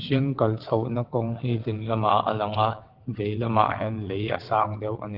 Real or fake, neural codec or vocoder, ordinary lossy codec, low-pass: fake; vocoder, 22.05 kHz, 80 mel bands, WaveNeXt; Opus, 16 kbps; 5.4 kHz